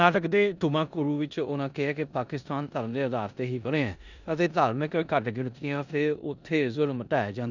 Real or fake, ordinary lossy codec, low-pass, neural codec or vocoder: fake; none; 7.2 kHz; codec, 16 kHz in and 24 kHz out, 0.9 kbps, LongCat-Audio-Codec, four codebook decoder